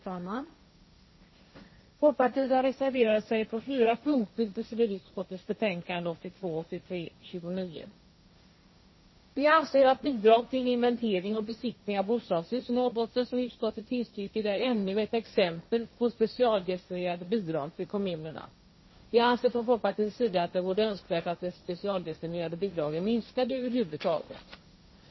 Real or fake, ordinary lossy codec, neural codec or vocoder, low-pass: fake; MP3, 24 kbps; codec, 16 kHz, 1.1 kbps, Voila-Tokenizer; 7.2 kHz